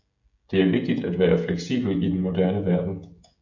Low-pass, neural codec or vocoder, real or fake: 7.2 kHz; codec, 16 kHz, 16 kbps, FreqCodec, smaller model; fake